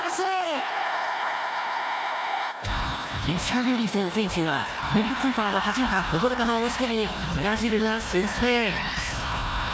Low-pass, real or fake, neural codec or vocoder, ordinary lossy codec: none; fake; codec, 16 kHz, 1 kbps, FunCodec, trained on Chinese and English, 50 frames a second; none